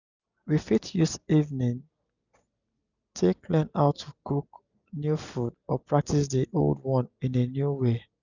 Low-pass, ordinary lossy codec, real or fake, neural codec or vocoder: 7.2 kHz; none; real; none